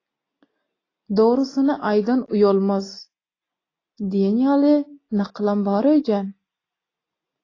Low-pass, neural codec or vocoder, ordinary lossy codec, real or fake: 7.2 kHz; none; AAC, 32 kbps; real